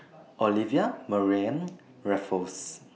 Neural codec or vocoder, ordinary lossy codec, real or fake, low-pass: none; none; real; none